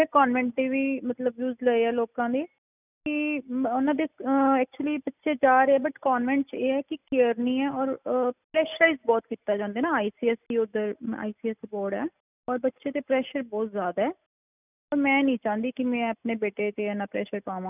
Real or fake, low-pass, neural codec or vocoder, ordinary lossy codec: real; 3.6 kHz; none; none